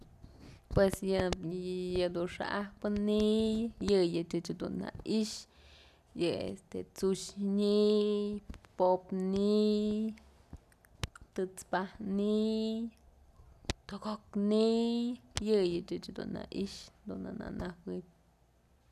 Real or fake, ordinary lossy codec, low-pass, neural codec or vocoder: real; none; 14.4 kHz; none